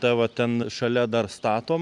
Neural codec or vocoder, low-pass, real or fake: none; 10.8 kHz; real